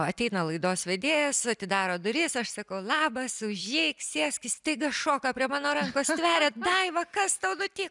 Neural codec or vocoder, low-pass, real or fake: none; 10.8 kHz; real